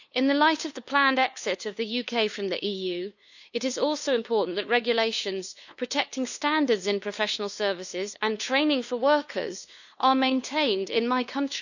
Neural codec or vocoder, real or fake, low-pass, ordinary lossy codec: codec, 16 kHz, 2 kbps, FunCodec, trained on LibriTTS, 25 frames a second; fake; 7.2 kHz; none